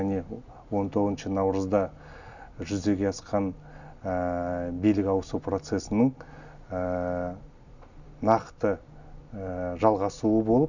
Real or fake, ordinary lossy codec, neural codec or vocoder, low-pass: real; none; none; 7.2 kHz